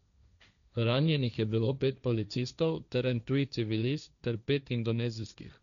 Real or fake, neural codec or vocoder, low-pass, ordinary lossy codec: fake; codec, 16 kHz, 1.1 kbps, Voila-Tokenizer; 7.2 kHz; none